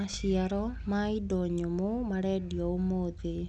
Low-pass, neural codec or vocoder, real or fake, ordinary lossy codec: none; none; real; none